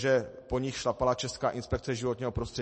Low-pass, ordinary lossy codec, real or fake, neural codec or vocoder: 10.8 kHz; MP3, 32 kbps; real; none